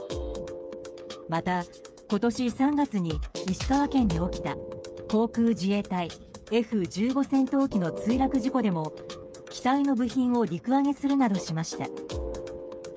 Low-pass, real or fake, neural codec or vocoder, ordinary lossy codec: none; fake; codec, 16 kHz, 8 kbps, FreqCodec, smaller model; none